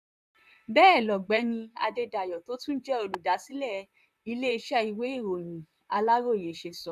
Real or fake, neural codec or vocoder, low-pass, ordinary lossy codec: fake; vocoder, 44.1 kHz, 128 mel bands, Pupu-Vocoder; 14.4 kHz; none